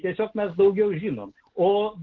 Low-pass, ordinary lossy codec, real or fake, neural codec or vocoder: 7.2 kHz; Opus, 16 kbps; real; none